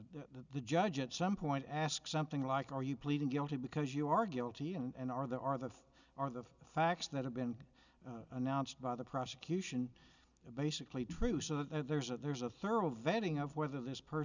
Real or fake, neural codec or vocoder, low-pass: real; none; 7.2 kHz